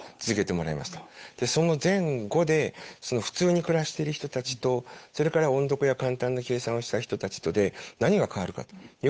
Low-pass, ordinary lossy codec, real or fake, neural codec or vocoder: none; none; fake; codec, 16 kHz, 8 kbps, FunCodec, trained on Chinese and English, 25 frames a second